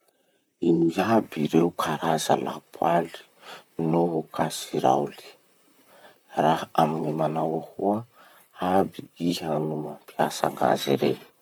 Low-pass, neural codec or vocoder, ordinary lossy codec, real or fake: none; vocoder, 44.1 kHz, 128 mel bands, Pupu-Vocoder; none; fake